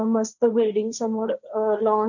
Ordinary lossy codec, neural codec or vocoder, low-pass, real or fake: none; codec, 16 kHz, 1.1 kbps, Voila-Tokenizer; 7.2 kHz; fake